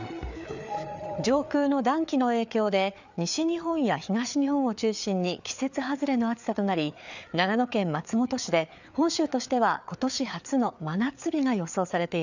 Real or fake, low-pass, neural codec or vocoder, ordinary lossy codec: fake; 7.2 kHz; codec, 16 kHz, 4 kbps, FreqCodec, larger model; none